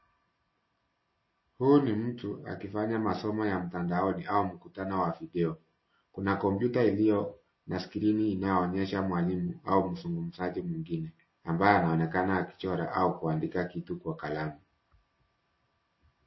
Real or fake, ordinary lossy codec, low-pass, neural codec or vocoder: real; MP3, 24 kbps; 7.2 kHz; none